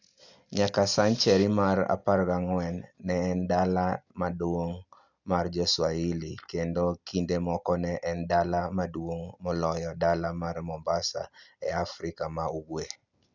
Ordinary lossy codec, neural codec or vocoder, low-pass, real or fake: none; none; 7.2 kHz; real